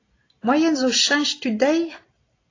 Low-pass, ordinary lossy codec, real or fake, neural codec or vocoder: 7.2 kHz; AAC, 32 kbps; real; none